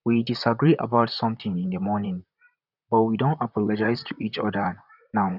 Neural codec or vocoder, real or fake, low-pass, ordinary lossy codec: vocoder, 44.1 kHz, 128 mel bands, Pupu-Vocoder; fake; 5.4 kHz; none